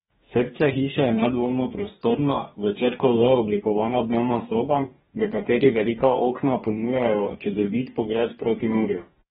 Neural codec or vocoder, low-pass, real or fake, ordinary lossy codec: codec, 44.1 kHz, 2.6 kbps, DAC; 19.8 kHz; fake; AAC, 16 kbps